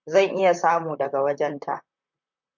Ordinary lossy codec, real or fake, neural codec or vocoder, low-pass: MP3, 64 kbps; fake; vocoder, 44.1 kHz, 128 mel bands, Pupu-Vocoder; 7.2 kHz